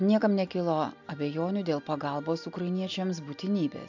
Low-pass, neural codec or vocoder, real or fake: 7.2 kHz; none; real